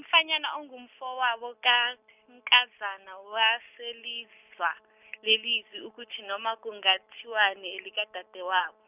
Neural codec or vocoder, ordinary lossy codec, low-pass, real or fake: none; none; 3.6 kHz; real